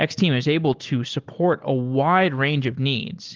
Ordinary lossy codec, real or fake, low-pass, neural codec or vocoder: Opus, 16 kbps; real; 7.2 kHz; none